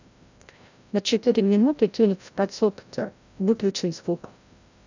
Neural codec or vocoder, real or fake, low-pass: codec, 16 kHz, 0.5 kbps, FreqCodec, larger model; fake; 7.2 kHz